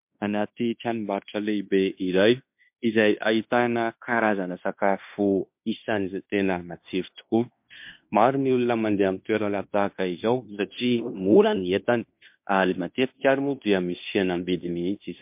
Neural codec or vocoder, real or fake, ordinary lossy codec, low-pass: codec, 16 kHz in and 24 kHz out, 0.9 kbps, LongCat-Audio-Codec, fine tuned four codebook decoder; fake; MP3, 32 kbps; 3.6 kHz